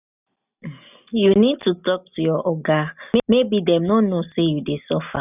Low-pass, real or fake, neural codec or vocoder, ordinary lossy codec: 3.6 kHz; real; none; none